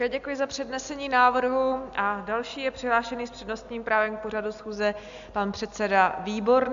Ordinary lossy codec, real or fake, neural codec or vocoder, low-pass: AAC, 64 kbps; real; none; 7.2 kHz